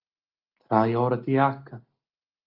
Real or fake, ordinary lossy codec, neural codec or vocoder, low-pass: real; Opus, 32 kbps; none; 5.4 kHz